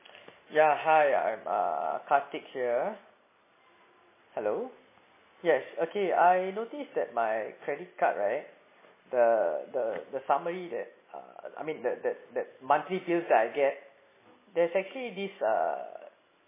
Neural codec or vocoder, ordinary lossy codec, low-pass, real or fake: none; MP3, 16 kbps; 3.6 kHz; real